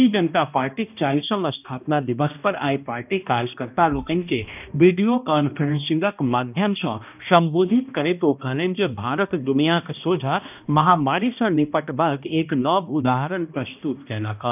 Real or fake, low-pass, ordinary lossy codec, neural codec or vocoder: fake; 3.6 kHz; none; codec, 16 kHz, 1 kbps, X-Codec, HuBERT features, trained on balanced general audio